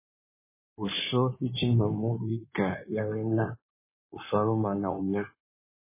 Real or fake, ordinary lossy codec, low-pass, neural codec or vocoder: fake; MP3, 16 kbps; 3.6 kHz; codec, 16 kHz in and 24 kHz out, 2.2 kbps, FireRedTTS-2 codec